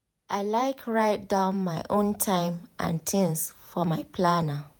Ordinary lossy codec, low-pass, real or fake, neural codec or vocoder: none; none; fake; vocoder, 48 kHz, 128 mel bands, Vocos